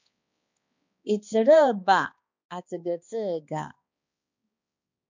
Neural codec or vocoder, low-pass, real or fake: codec, 16 kHz, 2 kbps, X-Codec, HuBERT features, trained on balanced general audio; 7.2 kHz; fake